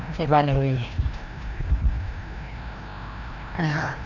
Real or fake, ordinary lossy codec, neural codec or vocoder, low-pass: fake; none; codec, 16 kHz, 1 kbps, FreqCodec, larger model; 7.2 kHz